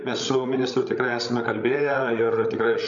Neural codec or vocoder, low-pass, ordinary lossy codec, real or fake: codec, 16 kHz, 16 kbps, FreqCodec, larger model; 7.2 kHz; MP3, 64 kbps; fake